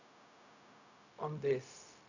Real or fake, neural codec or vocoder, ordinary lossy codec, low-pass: fake; codec, 16 kHz, 0.4 kbps, LongCat-Audio-Codec; none; 7.2 kHz